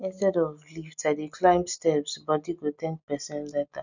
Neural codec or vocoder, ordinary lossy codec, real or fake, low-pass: none; none; real; 7.2 kHz